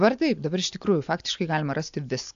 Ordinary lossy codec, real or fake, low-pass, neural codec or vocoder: AAC, 64 kbps; real; 7.2 kHz; none